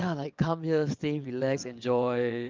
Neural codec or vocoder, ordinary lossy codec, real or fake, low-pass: vocoder, 22.05 kHz, 80 mel bands, WaveNeXt; Opus, 32 kbps; fake; 7.2 kHz